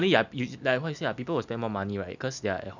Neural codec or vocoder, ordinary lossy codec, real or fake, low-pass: none; none; real; 7.2 kHz